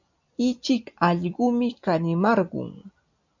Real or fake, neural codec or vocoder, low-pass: real; none; 7.2 kHz